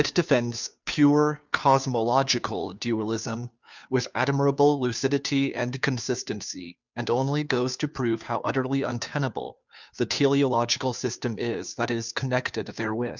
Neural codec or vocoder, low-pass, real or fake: codec, 16 kHz, 2 kbps, FunCodec, trained on Chinese and English, 25 frames a second; 7.2 kHz; fake